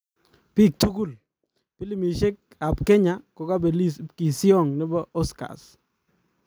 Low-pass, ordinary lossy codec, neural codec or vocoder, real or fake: none; none; none; real